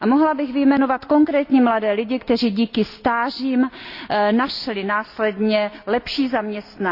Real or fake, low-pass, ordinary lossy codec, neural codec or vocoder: real; 5.4 kHz; Opus, 64 kbps; none